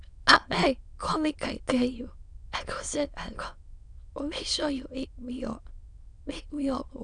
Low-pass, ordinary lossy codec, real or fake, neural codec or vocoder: 9.9 kHz; none; fake; autoencoder, 22.05 kHz, a latent of 192 numbers a frame, VITS, trained on many speakers